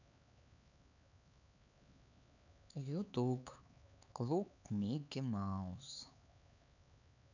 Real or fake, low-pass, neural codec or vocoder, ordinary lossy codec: fake; 7.2 kHz; codec, 16 kHz, 4 kbps, X-Codec, HuBERT features, trained on LibriSpeech; none